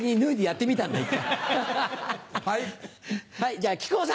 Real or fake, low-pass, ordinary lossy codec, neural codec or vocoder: real; none; none; none